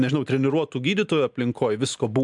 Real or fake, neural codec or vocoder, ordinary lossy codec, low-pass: real; none; MP3, 96 kbps; 10.8 kHz